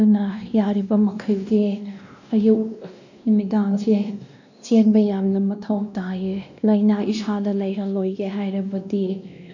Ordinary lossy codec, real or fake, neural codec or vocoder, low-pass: none; fake; codec, 16 kHz, 1 kbps, X-Codec, WavLM features, trained on Multilingual LibriSpeech; 7.2 kHz